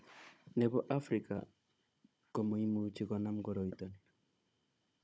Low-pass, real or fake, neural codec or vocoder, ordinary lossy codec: none; fake; codec, 16 kHz, 16 kbps, FunCodec, trained on Chinese and English, 50 frames a second; none